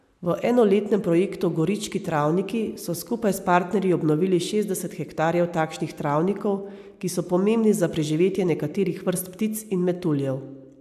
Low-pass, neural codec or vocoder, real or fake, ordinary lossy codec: 14.4 kHz; none; real; AAC, 96 kbps